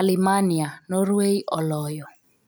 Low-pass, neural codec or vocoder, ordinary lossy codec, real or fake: none; none; none; real